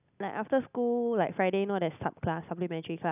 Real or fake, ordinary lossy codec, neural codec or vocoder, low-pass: real; none; none; 3.6 kHz